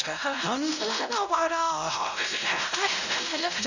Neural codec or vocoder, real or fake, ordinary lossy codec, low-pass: codec, 16 kHz, 0.5 kbps, X-Codec, WavLM features, trained on Multilingual LibriSpeech; fake; none; 7.2 kHz